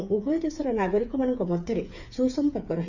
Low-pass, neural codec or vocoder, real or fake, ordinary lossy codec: 7.2 kHz; codec, 16 kHz, 8 kbps, FreqCodec, smaller model; fake; none